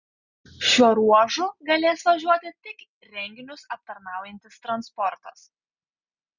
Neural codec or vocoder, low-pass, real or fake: none; 7.2 kHz; real